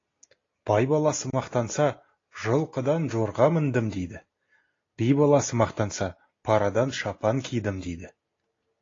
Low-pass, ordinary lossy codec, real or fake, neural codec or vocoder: 7.2 kHz; AAC, 32 kbps; real; none